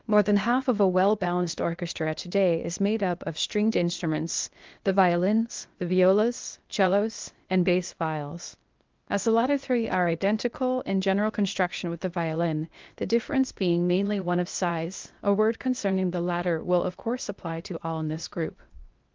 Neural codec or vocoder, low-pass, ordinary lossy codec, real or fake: codec, 16 kHz, 0.8 kbps, ZipCodec; 7.2 kHz; Opus, 32 kbps; fake